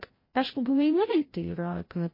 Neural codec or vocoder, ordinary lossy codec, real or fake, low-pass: codec, 16 kHz, 0.5 kbps, FreqCodec, larger model; MP3, 24 kbps; fake; 5.4 kHz